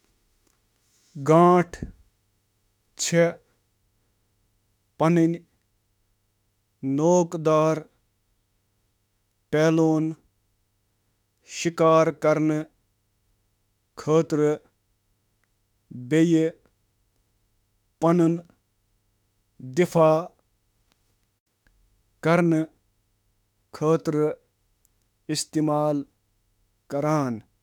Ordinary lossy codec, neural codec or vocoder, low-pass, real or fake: none; autoencoder, 48 kHz, 32 numbers a frame, DAC-VAE, trained on Japanese speech; 19.8 kHz; fake